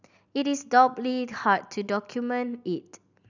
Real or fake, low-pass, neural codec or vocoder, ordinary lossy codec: real; 7.2 kHz; none; none